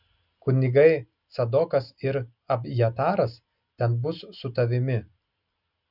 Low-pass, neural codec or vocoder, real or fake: 5.4 kHz; none; real